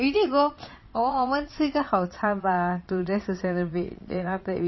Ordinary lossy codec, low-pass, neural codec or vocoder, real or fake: MP3, 24 kbps; 7.2 kHz; vocoder, 22.05 kHz, 80 mel bands, Vocos; fake